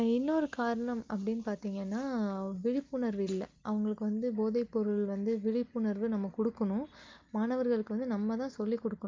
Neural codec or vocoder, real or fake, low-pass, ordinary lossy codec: none; real; 7.2 kHz; Opus, 32 kbps